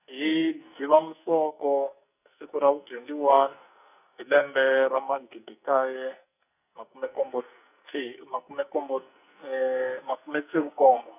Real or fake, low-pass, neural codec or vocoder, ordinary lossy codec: fake; 3.6 kHz; codec, 44.1 kHz, 2.6 kbps, SNAC; none